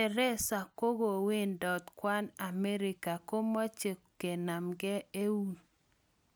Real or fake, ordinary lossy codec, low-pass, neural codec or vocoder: real; none; none; none